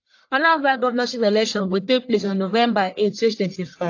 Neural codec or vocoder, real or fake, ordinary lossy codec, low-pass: codec, 44.1 kHz, 1.7 kbps, Pupu-Codec; fake; AAC, 48 kbps; 7.2 kHz